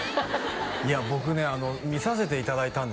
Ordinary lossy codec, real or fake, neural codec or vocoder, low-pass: none; real; none; none